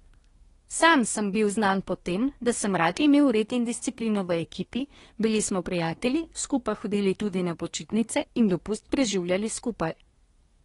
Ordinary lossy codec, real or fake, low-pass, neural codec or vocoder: AAC, 32 kbps; fake; 10.8 kHz; codec, 24 kHz, 1 kbps, SNAC